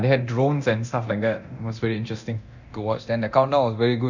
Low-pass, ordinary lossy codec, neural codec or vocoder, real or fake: 7.2 kHz; none; codec, 24 kHz, 0.9 kbps, DualCodec; fake